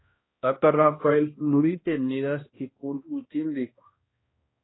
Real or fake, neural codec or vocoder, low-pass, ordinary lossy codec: fake; codec, 16 kHz, 1 kbps, X-Codec, HuBERT features, trained on balanced general audio; 7.2 kHz; AAC, 16 kbps